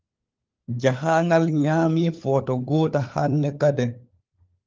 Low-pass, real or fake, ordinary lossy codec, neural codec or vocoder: 7.2 kHz; fake; Opus, 32 kbps; codec, 16 kHz, 4 kbps, FunCodec, trained on LibriTTS, 50 frames a second